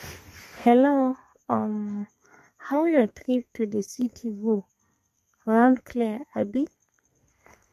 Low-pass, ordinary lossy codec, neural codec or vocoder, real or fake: 14.4 kHz; MP3, 64 kbps; codec, 32 kHz, 1.9 kbps, SNAC; fake